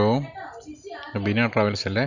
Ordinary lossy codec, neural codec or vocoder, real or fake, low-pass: none; none; real; 7.2 kHz